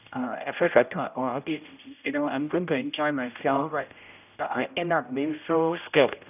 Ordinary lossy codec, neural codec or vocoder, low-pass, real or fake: none; codec, 16 kHz, 0.5 kbps, X-Codec, HuBERT features, trained on general audio; 3.6 kHz; fake